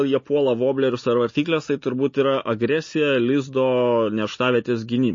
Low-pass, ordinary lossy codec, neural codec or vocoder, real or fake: 7.2 kHz; MP3, 32 kbps; none; real